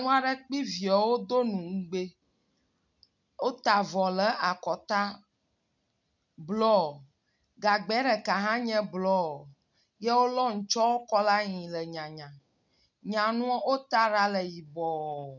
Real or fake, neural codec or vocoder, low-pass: real; none; 7.2 kHz